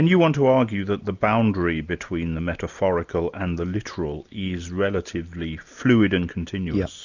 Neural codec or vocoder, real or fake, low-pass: none; real; 7.2 kHz